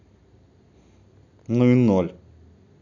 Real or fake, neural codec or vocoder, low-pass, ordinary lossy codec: real; none; 7.2 kHz; AAC, 48 kbps